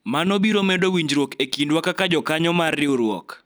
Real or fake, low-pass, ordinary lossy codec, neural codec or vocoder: real; none; none; none